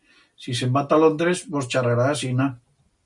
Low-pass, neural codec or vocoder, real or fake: 10.8 kHz; none; real